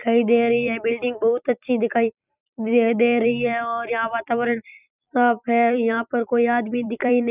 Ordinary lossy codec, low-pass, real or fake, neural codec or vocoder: none; 3.6 kHz; fake; vocoder, 44.1 kHz, 128 mel bands every 256 samples, BigVGAN v2